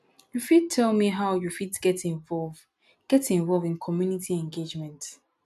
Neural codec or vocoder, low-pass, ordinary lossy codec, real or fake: none; 14.4 kHz; none; real